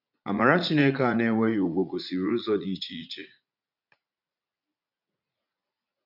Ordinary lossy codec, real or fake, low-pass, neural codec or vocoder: none; fake; 5.4 kHz; vocoder, 44.1 kHz, 80 mel bands, Vocos